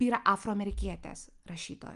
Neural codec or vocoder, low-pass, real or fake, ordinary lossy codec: none; 10.8 kHz; real; Opus, 32 kbps